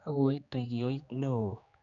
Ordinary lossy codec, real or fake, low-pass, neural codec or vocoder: none; fake; 7.2 kHz; codec, 16 kHz, 2 kbps, X-Codec, HuBERT features, trained on general audio